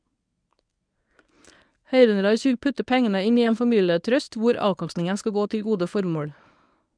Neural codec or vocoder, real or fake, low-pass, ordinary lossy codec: codec, 24 kHz, 0.9 kbps, WavTokenizer, medium speech release version 1; fake; 9.9 kHz; none